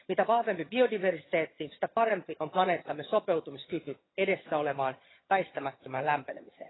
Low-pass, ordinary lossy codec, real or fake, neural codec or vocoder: 7.2 kHz; AAC, 16 kbps; fake; vocoder, 22.05 kHz, 80 mel bands, HiFi-GAN